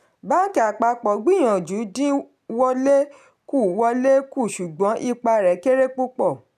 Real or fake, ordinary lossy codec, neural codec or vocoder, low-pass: real; none; none; 14.4 kHz